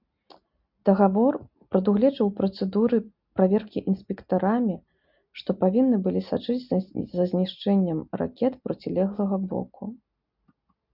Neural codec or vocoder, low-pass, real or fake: none; 5.4 kHz; real